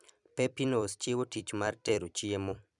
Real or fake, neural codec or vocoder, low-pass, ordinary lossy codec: fake; vocoder, 44.1 kHz, 128 mel bands every 256 samples, BigVGAN v2; 10.8 kHz; none